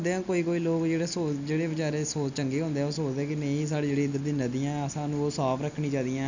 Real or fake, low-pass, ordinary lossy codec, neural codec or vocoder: real; 7.2 kHz; none; none